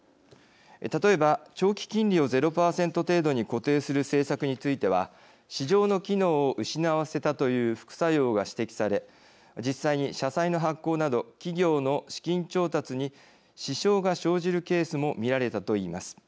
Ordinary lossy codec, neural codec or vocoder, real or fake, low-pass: none; none; real; none